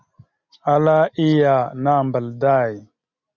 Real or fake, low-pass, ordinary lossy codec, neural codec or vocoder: real; 7.2 kHz; Opus, 64 kbps; none